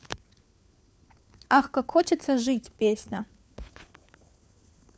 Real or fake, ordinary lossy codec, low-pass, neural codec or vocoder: fake; none; none; codec, 16 kHz, 4 kbps, FunCodec, trained on LibriTTS, 50 frames a second